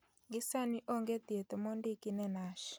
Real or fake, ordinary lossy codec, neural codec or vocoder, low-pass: real; none; none; none